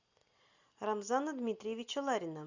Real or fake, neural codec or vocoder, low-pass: real; none; 7.2 kHz